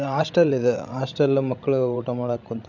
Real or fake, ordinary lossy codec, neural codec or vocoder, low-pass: fake; none; codec, 16 kHz, 16 kbps, FreqCodec, larger model; 7.2 kHz